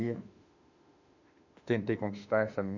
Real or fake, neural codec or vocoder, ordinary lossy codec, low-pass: fake; autoencoder, 48 kHz, 32 numbers a frame, DAC-VAE, trained on Japanese speech; none; 7.2 kHz